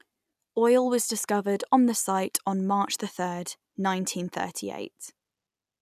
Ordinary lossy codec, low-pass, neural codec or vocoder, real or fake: none; 14.4 kHz; none; real